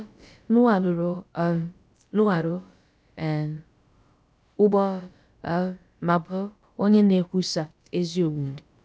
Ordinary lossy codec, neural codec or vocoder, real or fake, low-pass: none; codec, 16 kHz, about 1 kbps, DyCAST, with the encoder's durations; fake; none